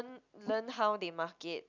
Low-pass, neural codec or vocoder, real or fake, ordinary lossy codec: 7.2 kHz; none; real; none